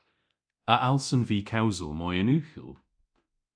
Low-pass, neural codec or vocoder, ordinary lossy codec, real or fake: 9.9 kHz; codec, 24 kHz, 0.9 kbps, DualCodec; MP3, 96 kbps; fake